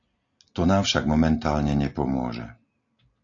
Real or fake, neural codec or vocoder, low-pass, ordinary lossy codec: real; none; 7.2 kHz; AAC, 48 kbps